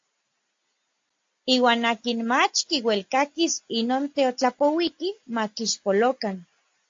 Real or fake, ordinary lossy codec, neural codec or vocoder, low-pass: real; AAC, 48 kbps; none; 7.2 kHz